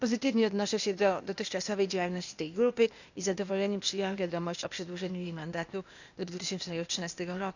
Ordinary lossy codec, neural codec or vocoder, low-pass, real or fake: none; codec, 16 kHz, 0.8 kbps, ZipCodec; 7.2 kHz; fake